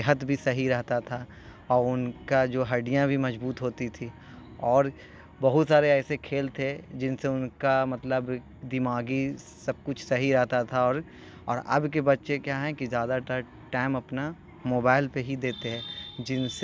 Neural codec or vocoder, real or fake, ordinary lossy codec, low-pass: none; real; none; none